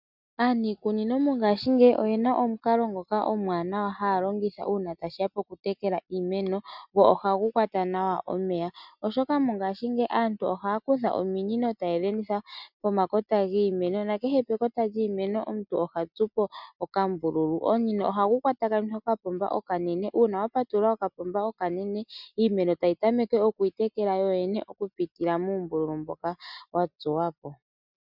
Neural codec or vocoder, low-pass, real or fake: none; 5.4 kHz; real